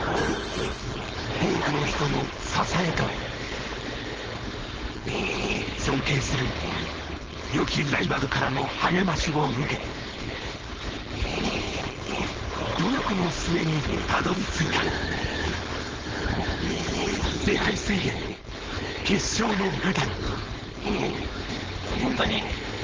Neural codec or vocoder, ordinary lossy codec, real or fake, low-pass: codec, 16 kHz, 4.8 kbps, FACodec; Opus, 16 kbps; fake; 7.2 kHz